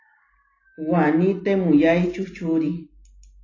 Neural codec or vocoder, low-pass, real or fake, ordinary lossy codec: none; 7.2 kHz; real; AAC, 32 kbps